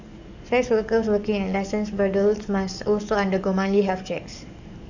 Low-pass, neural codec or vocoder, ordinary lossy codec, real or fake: 7.2 kHz; codec, 44.1 kHz, 7.8 kbps, DAC; none; fake